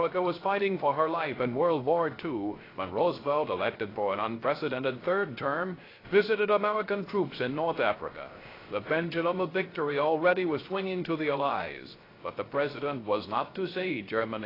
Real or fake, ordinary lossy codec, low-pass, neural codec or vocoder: fake; AAC, 24 kbps; 5.4 kHz; codec, 16 kHz, 0.3 kbps, FocalCodec